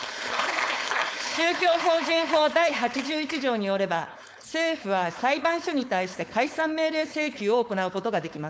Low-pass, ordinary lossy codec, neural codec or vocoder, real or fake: none; none; codec, 16 kHz, 4.8 kbps, FACodec; fake